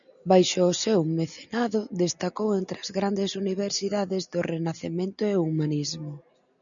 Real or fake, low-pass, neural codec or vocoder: real; 7.2 kHz; none